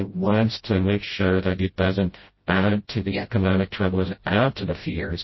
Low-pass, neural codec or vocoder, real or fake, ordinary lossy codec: 7.2 kHz; codec, 16 kHz, 0.5 kbps, FreqCodec, smaller model; fake; MP3, 24 kbps